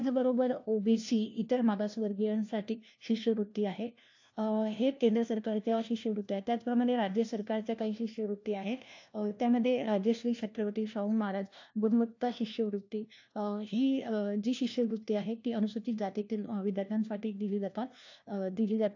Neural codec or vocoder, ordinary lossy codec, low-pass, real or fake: codec, 16 kHz, 1 kbps, FunCodec, trained on LibriTTS, 50 frames a second; AAC, 48 kbps; 7.2 kHz; fake